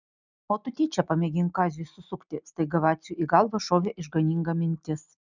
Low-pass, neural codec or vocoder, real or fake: 7.2 kHz; none; real